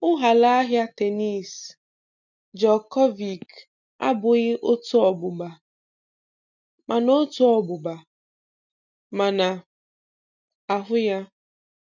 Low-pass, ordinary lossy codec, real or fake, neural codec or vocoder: 7.2 kHz; none; real; none